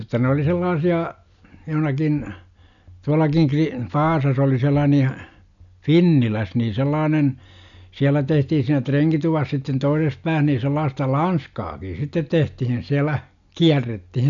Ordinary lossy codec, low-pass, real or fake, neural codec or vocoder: none; 7.2 kHz; real; none